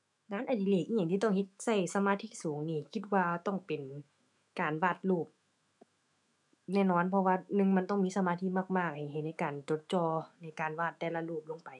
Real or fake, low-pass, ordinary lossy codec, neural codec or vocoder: fake; 10.8 kHz; none; autoencoder, 48 kHz, 128 numbers a frame, DAC-VAE, trained on Japanese speech